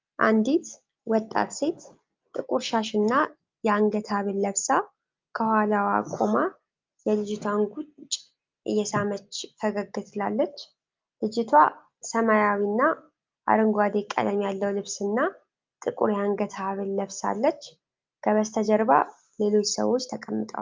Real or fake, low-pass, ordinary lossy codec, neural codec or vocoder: real; 7.2 kHz; Opus, 24 kbps; none